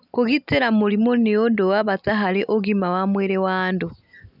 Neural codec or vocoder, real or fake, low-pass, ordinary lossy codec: none; real; 5.4 kHz; none